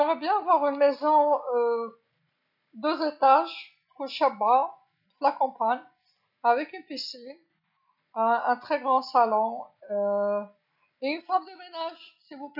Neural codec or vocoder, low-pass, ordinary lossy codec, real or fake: none; 5.4 kHz; none; real